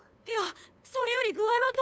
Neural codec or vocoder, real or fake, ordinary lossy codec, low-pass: codec, 16 kHz, 2 kbps, FunCodec, trained on LibriTTS, 25 frames a second; fake; none; none